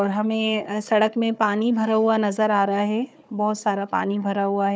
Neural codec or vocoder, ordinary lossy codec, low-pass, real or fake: codec, 16 kHz, 4 kbps, FunCodec, trained on Chinese and English, 50 frames a second; none; none; fake